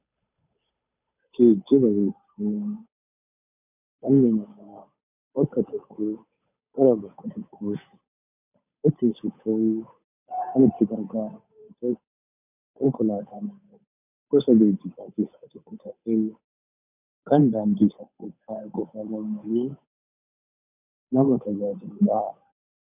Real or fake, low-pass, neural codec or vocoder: fake; 3.6 kHz; codec, 16 kHz, 8 kbps, FunCodec, trained on Chinese and English, 25 frames a second